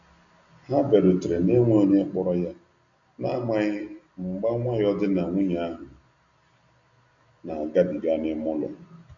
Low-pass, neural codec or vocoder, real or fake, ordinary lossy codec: 7.2 kHz; none; real; none